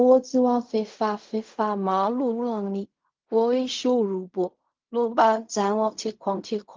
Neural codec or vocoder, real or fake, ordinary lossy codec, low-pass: codec, 16 kHz in and 24 kHz out, 0.4 kbps, LongCat-Audio-Codec, fine tuned four codebook decoder; fake; Opus, 32 kbps; 7.2 kHz